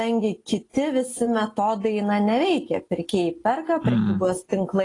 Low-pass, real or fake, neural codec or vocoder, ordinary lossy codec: 10.8 kHz; real; none; AAC, 32 kbps